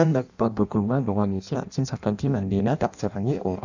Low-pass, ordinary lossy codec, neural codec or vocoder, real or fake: 7.2 kHz; none; codec, 16 kHz in and 24 kHz out, 0.6 kbps, FireRedTTS-2 codec; fake